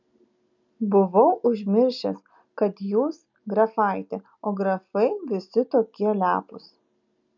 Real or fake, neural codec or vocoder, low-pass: real; none; 7.2 kHz